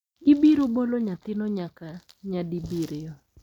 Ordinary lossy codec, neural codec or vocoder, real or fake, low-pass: none; none; real; 19.8 kHz